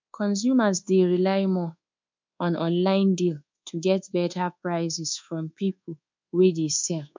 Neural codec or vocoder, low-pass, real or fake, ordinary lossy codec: codec, 24 kHz, 1.2 kbps, DualCodec; 7.2 kHz; fake; MP3, 64 kbps